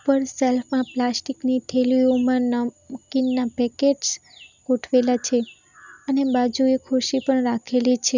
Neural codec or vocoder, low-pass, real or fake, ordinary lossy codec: none; 7.2 kHz; real; none